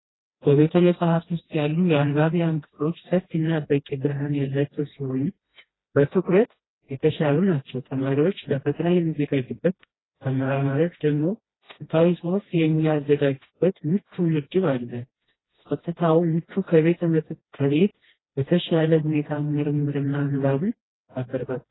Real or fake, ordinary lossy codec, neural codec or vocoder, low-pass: fake; AAC, 16 kbps; codec, 16 kHz, 1 kbps, FreqCodec, smaller model; 7.2 kHz